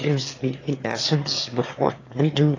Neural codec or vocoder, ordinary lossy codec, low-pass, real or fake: autoencoder, 22.05 kHz, a latent of 192 numbers a frame, VITS, trained on one speaker; AAC, 32 kbps; 7.2 kHz; fake